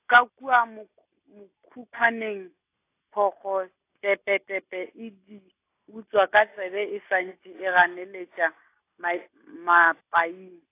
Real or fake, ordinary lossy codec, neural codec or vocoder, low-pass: real; AAC, 24 kbps; none; 3.6 kHz